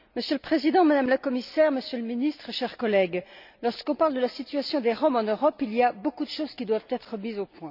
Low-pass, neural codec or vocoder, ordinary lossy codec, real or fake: 5.4 kHz; none; none; real